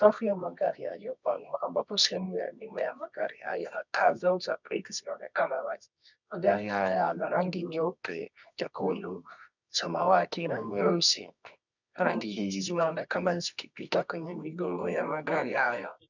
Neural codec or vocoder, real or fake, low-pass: codec, 24 kHz, 0.9 kbps, WavTokenizer, medium music audio release; fake; 7.2 kHz